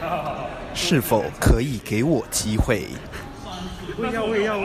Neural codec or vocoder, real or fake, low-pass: none; real; 14.4 kHz